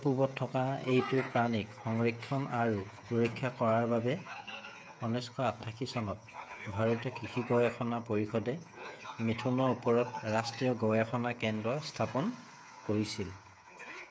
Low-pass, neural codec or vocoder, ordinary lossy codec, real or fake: none; codec, 16 kHz, 8 kbps, FreqCodec, smaller model; none; fake